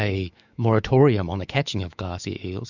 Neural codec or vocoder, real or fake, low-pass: autoencoder, 48 kHz, 128 numbers a frame, DAC-VAE, trained on Japanese speech; fake; 7.2 kHz